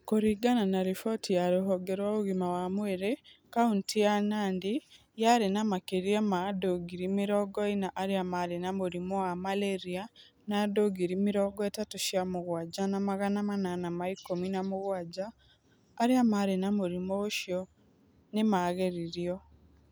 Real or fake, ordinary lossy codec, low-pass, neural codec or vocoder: real; none; none; none